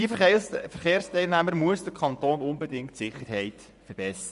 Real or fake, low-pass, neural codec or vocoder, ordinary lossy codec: fake; 10.8 kHz; vocoder, 24 kHz, 100 mel bands, Vocos; none